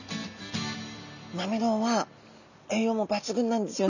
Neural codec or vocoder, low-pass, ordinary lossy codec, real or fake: none; 7.2 kHz; none; real